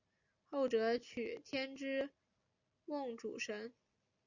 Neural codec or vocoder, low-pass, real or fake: none; 7.2 kHz; real